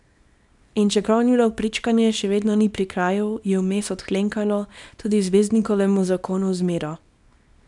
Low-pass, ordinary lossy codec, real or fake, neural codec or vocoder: 10.8 kHz; none; fake; codec, 24 kHz, 0.9 kbps, WavTokenizer, small release